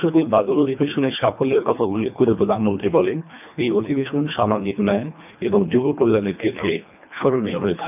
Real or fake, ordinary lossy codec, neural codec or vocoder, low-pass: fake; none; codec, 24 kHz, 1.5 kbps, HILCodec; 3.6 kHz